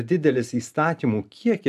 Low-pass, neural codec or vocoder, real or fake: 14.4 kHz; none; real